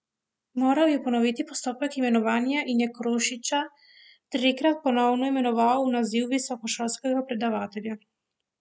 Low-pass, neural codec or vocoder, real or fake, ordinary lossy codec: none; none; real; none